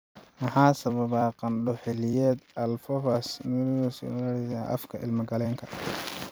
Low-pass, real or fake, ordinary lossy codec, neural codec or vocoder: none; fake; none; vocoder, 44.1 kHz, 128 mel bands every 256 samples, BigVGAN v2